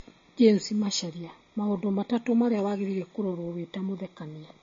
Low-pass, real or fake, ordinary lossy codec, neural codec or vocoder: 7.2 kHz; real; MP3, 32 kbps; none